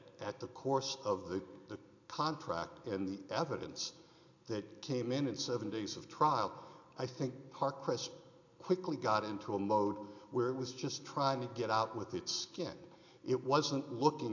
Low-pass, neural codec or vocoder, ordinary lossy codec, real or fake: 7.2 kHz; none; AAC, 48 kbps; real